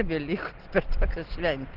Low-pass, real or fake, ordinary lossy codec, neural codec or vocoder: 5.4 kHz; real; Opus, 16 kbps; none